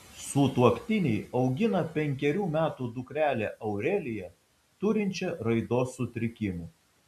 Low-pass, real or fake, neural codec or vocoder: 14.4 kHz; real; none